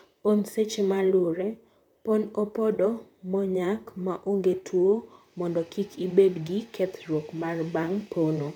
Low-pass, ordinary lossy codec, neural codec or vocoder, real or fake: 19.8 kHz; none; vocoder, 44.1 kHz, 128 mel bands, Pupu-Vocoder; fake